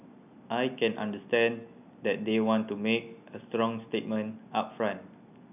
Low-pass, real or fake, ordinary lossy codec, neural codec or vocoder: 3.6 kHz; real; none; none